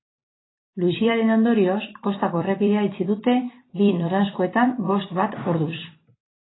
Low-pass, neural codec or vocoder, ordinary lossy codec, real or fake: 7.2 kHz; vocoder, 44.1 kHz, 128 mel bands every 256 samples, BigVGAN v2; AAC, 16 kbps; fake